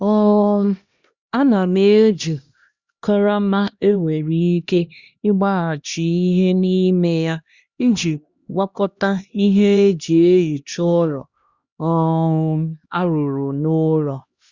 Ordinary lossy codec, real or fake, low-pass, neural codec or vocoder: Opus, 64 kbps; fake; 7.2 kHz; codec, 16 kHz, 1 kbps, X-Codec, HuBERT features, trained on LibriSpeech